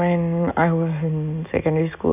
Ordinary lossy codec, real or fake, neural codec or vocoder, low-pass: none; real; none; 3.6 kHz